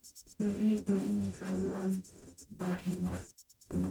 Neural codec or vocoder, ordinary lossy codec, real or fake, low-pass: codec, 44.1 kHz, 0.9 kbps, DAC; MP3, 96 kbps; fake; 19.8 kHz